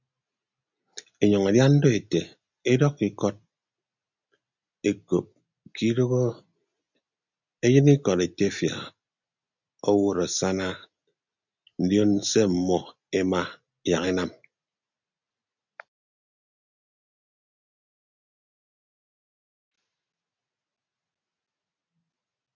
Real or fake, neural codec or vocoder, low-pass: real; none; 7.2 kHz